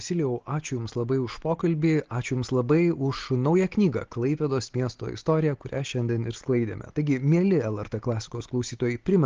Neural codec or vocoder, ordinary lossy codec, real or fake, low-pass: none; Opus, 16 kbps; real; 7.2 kHz